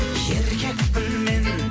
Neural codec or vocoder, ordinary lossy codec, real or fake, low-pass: none; none; real; none